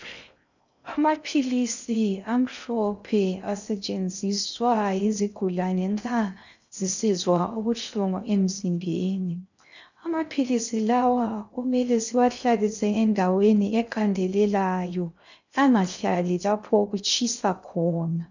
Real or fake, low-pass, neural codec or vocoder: fake; 7.2 kHz; codec, 16 kHz in and 24 kHz out, 0.6 kbps, FocalCodec, streaming, 2048 codes